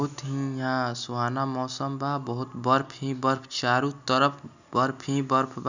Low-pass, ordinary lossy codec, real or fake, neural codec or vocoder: 7.2 kHz; none; real; none